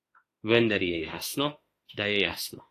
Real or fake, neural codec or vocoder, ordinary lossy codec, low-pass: fake; autoencoder, 48 kHz, 32 numbers a frame, DAC-VAE, trained on Japanese speech; AAC, 48 kbps; 14.4 kHz